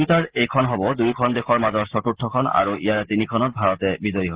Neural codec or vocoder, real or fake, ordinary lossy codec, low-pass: none; real; Opus, 16 kbps; 3.6 kHz